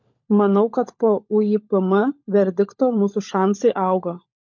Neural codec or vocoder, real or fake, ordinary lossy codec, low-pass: codec, 16 kHz, 16 kbps, FunCodec, trained on LibriTTS, 50 frames a second; fake; MP3, 48 kbps; 7.2 kHz